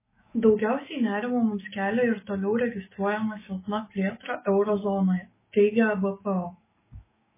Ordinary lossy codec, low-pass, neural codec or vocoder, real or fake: MP3, 16 kbps; 3.6 kHz; autoencoder, 48 kHz, 128 numbers a frame, DAC-VAE, trained on Japanese speech; fake